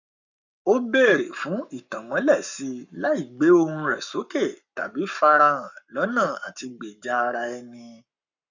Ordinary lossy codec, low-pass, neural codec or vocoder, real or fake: none; 7.2 kHz; codec, 44.1 kHz, 7.8 kbps, Pupu-Codec; fake